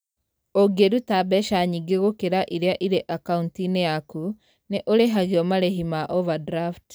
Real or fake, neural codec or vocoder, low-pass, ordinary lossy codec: real; none; none; none